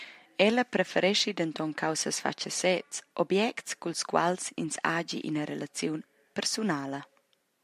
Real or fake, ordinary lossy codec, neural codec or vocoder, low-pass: real; MP3, 96 kbps; none; 14.4 kHz